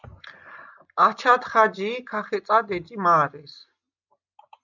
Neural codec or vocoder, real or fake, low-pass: none; real; 7.2 kHz